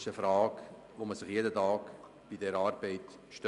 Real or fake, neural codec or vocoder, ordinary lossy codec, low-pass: real; none; none; 10.8 kHz